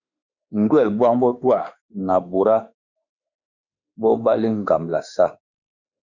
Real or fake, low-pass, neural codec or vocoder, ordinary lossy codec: fake; 7.2 kHz; autoencoder, 48 kHz, 32 numbers a frame, DAC-VAE, trained on Japanese speech; Opus, 64 kbps